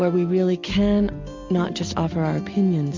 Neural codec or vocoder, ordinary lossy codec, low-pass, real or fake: none; MP3, 48 kbps; 7.2 kHz; real